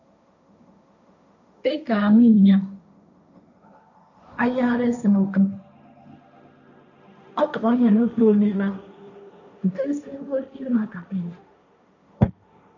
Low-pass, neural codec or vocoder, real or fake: 7.2 kHz; codec, 16 kHz, 1.1 kbps, Voila-Tokenizer; fake